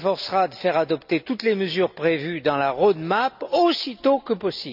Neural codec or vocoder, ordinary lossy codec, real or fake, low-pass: none; none; real; 5.4 kHz